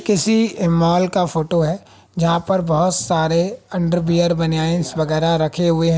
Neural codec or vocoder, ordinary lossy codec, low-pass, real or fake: none; none; none; real